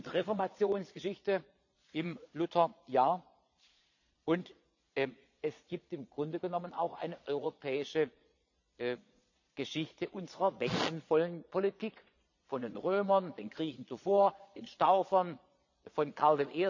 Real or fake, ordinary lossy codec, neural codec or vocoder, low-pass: fake; none; vocoder, 22.05 kHz, 80 mel bands, Vocos; 7.2 kHz